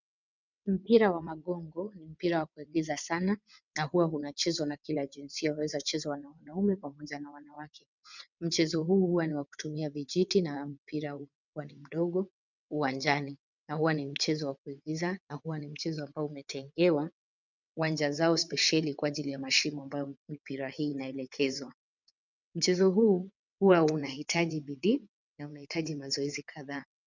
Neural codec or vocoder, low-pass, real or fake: vocoder, 22.05 kHz, 80 mel bands, WaveNeXt; 7.2 kHz; fake